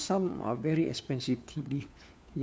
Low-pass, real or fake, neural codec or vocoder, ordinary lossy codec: none; fake; codec, 16 kHz, 4 kbps, FunCodec, trained on LibriTTS, 50 frames a second; none